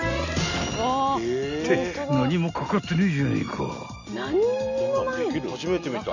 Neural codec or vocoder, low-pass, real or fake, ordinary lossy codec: none; 7.2 kHz; real; none